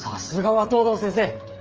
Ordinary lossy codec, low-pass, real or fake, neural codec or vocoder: Opus, 24 kbps; 7.2 kHz; fake; codec, 16 kHz, 8 kbps, FreqCodec, smaller model